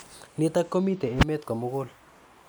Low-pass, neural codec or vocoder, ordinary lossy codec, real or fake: none; none; none; real